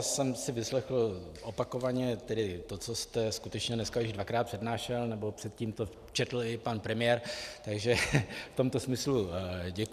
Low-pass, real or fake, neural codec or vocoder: 14.4 kHz; fake; vocoder, 44.1 kHz, 128 mel bands every 512 samples, BigVGAN v2